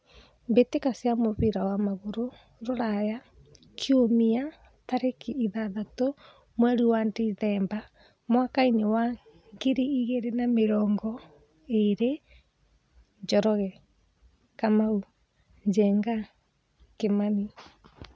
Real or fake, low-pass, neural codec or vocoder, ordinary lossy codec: real; none; none; none